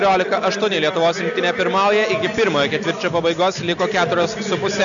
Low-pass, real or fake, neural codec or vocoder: 7.2 kHz; real; none